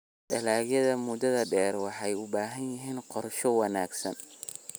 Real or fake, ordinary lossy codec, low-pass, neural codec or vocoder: real; none; none; none